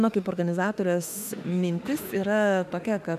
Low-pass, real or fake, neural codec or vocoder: 14.4 kHz; fake; autoencoder, 48 kHz, 32 numbers a frame, DAC-VAE, trained on Japanese speech